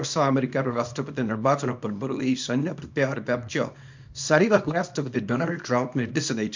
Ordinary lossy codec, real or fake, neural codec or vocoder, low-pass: MP3, 64 kbps; fake; codec, 24 kHz, 0.9 kbps, WavTokenizer, small release; 7.2 kHz